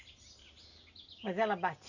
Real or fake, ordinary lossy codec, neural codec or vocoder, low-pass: real; none; none; 7.2 kHz